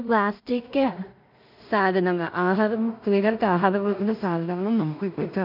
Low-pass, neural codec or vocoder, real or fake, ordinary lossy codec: 5.4 kHz; codec, 16 kHz in and 24 kHz out, 0.4 kbps, LongCat-Audio-Codec, two codebook decoder; fake; none